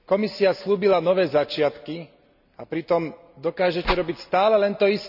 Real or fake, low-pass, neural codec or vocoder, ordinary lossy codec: real; 5.4 kHz; none; none